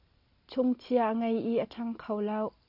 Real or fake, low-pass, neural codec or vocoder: real; 5.4 kHz; none